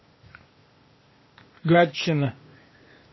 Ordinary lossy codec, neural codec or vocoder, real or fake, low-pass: MP3, 24 kbps; codec, 16 kHz, 0.8 kbps, ZipCodec; fake; 7.2 kHz